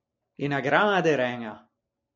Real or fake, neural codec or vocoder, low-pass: real; none; 7.2 kHz